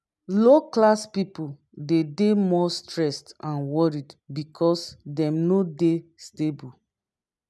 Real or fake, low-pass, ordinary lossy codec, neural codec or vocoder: real; none; none; none